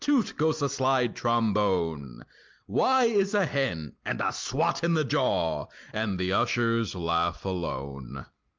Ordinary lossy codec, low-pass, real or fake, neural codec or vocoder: Opus, 32 kbps; 7.2 kHz; real; none